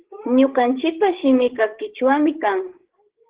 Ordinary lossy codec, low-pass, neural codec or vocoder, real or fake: Opus, 16 kbps; 3.6 kHz; codec, 16 kHz, 16 kbps, FreqCodec, larger model; fake